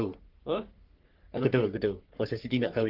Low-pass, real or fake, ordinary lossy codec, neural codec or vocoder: 5.4 kHz; fake; Opus, 16 kbps; codec, 44.1 kHz, 3.4 kbps, Pupu-Codec